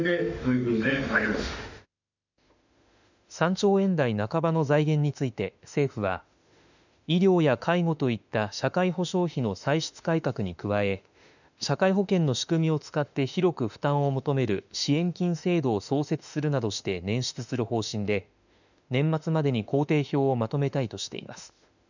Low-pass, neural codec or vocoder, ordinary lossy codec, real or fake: 7.2 kHz; autoencoder, 48 kHz, 32 numbers a frame, DAC-VAE, trained on Japanese speech; none; fake